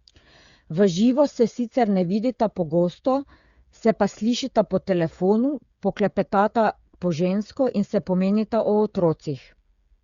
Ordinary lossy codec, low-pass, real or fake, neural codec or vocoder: Opus, 64 kbps; 7.2 kHz; fake; codec, 16 kHz, 8 kbps, FreqCodec, smaller model